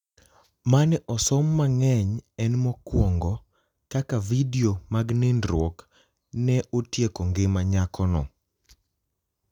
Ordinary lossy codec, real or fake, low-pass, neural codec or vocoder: none; real; 19.8 kHz; none